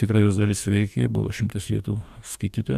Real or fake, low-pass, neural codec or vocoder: fake; 14.4 kHz; codec, 44.1 kHz, 2.6 kbps, DAC